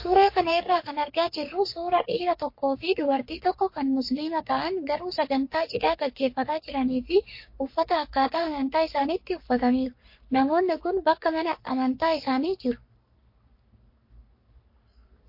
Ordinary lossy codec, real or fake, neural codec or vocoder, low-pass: MP3, 32 kbps; fake; codec, 44.1 kHz, 3.4 kbps, Pupu-Codec; 5.4 kHz